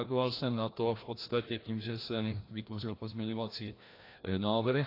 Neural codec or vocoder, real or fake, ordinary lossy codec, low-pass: codec, 16 kHz, 1 kbps, FreqCodec, larger model; fake; AAC, 32 kbps; 5.4 kHz